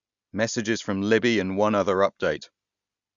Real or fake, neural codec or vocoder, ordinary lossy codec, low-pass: real; none; Opus, 64 kbps; 7.2 kHz